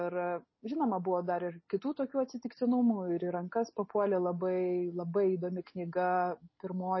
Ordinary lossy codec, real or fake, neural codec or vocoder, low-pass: MP3, 24 kbps; real; none; 7.2 kHz